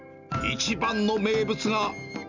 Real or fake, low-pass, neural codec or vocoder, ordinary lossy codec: real; 7.2 kHz; none; none